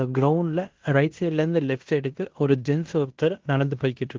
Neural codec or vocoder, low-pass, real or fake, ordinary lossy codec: codec, 16 kHz in and 24 kHz out, 0.9 kbps, LongCat-Audio-Codec, fine tuned four codebook decoder; 7.2 kHz; fake; Opus, 16 kbps